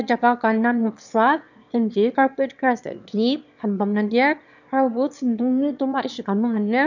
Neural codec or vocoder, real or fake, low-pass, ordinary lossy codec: autoencoder, 22.05 kHz, a latent of 192 numbers a frame, VITS, trained on one speaker; fake; 7.2 kHz; none